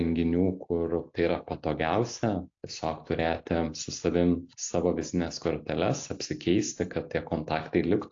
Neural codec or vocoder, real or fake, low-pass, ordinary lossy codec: none; real; 7.2 kHz; AAC, 48 kbps